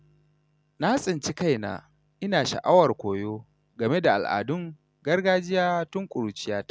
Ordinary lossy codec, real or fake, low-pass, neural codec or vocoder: none; real; none; none